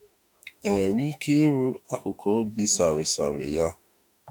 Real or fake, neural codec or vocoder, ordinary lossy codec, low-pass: fake; autoencoder, 48 kHz, 32 numbers a frame, DAC-VAE, trained on Japanese speech; none; none